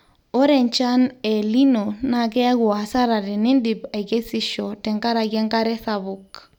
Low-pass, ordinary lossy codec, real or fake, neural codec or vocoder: 19.8 kHz; none; real; none